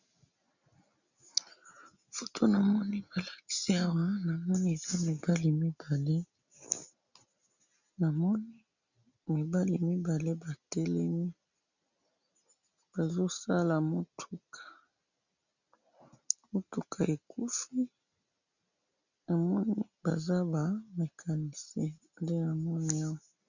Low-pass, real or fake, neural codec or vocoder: 7.2 kHz; real; none